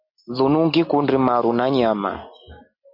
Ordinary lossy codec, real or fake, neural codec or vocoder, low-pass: MP3, 48 kbps; real; none; 5.4 kHz